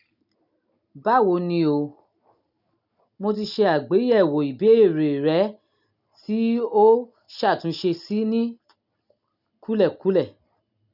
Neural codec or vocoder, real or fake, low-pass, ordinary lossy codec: none; real; 5.4 kHz; none